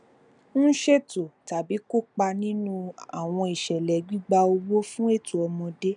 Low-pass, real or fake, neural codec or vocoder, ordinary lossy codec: 9.9 kHz; real; none; none